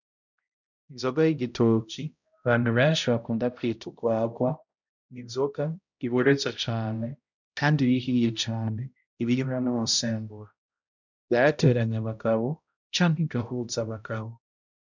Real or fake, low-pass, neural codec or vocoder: fake; 7.2 kHz; codec, 16 kHz, 0.5 kbps, X-Codec, HuBERT features, trained on balanced general audio